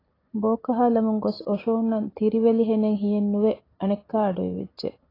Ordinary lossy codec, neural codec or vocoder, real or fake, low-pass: AAC, 24 kbps; none; real; 5.4 kHz